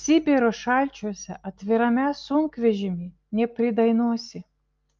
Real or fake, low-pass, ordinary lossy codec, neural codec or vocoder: real; 7.2 kHz; Opus, 24 kbps; none